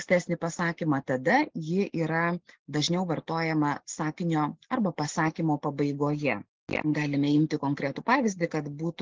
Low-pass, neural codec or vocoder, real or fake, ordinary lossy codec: 7.2 kHz; none; real; Opus, 16 kbps